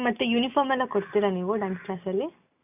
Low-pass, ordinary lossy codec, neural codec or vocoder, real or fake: 3.6 kHz; none; none; real